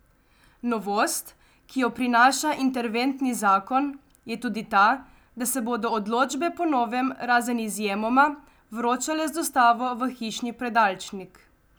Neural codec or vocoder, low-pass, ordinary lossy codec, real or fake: none; none; none; real